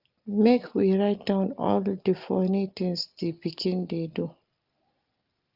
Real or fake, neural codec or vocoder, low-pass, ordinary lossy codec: real; none; 5.4 kHz; Opus, 32 kbps